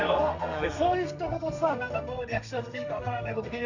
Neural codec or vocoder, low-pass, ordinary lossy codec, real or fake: codec, 32 kHz, 1.9 kbps, SNAC; 7.2 kHz; none; fake